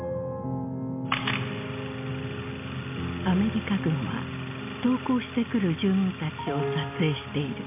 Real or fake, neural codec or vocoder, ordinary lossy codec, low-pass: real; none; MP3, 32 kbps; 3.6 kHz